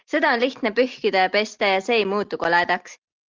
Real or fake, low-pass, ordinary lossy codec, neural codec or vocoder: real; 7.2 kHz; Opus, 24 kbps; none